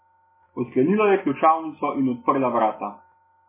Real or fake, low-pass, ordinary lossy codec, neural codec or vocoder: real; 3.6 kHz; MP3, 16 kbps; none